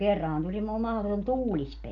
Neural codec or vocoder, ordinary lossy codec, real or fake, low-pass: none; MP3, 96 kbps; real; 7.2 kHz